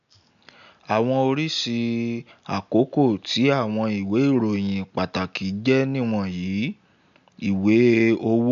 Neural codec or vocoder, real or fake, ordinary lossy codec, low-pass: none; real; AAC, 96 kbps; 7.2 kHz